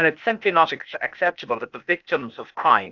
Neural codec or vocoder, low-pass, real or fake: codec, 16 kHz, 0.8 kbps, ZipCodec; 7.2 kHz; fake